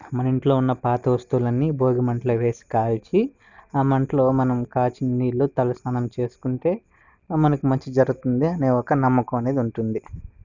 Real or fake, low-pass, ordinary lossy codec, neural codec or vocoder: real; 7.2 kHz; none; none